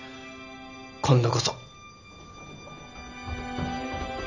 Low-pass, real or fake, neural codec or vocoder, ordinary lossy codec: 7.2 kHz; real; none; none